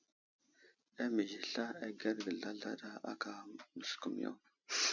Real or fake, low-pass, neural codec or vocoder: real; 7.2 kHz; none